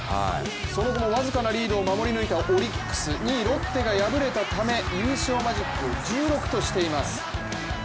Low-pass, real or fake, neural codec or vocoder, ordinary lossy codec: none; real; none; none